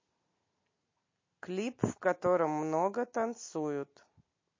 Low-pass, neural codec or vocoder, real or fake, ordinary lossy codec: 7.2 kHz; none; real; MP3, 32 kbps